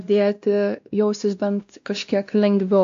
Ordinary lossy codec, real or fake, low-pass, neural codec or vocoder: AAC, 64 kbps; fake; 7.2 kHz; codec, 16 kHz, 1 kbps, X-Codec, WavLM features, trained on Multilingual LibriSpeech